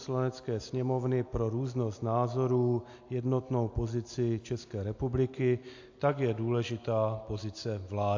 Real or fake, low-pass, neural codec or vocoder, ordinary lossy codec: real; 7.2 kHz; none; AAC, 48 kbps